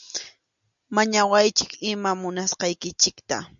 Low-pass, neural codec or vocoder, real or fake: 7.2 kHz; none; real